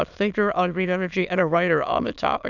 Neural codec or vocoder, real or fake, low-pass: autoencoder, 22.05 kHz, a latent of 192 numbers a frame, VITS, trained on many speakers; fake; 7.2 kHz